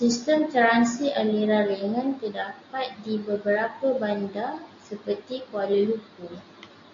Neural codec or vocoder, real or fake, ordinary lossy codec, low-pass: none; real; AAC, 48 kbps; 7.2 kHz